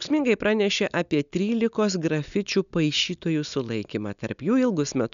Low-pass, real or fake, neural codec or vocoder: 7.2 kHz; real; none